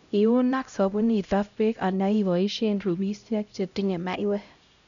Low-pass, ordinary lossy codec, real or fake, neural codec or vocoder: 7.2 kHz; none; fake; codec, 16 kHz, 0.5 kbps, X-Codec, HuBERT features, trained on LibriSpeech